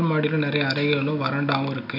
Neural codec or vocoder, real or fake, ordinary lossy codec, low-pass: none; real; none; 5.4 kHz